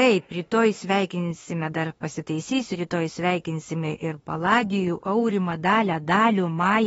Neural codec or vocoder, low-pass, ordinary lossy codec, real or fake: autoencoder, 48 kHz, 32 numbers a frame, DAC-VAE, trained on Japanese speech; 19.8 kHz; AAC, 24 kbps; fake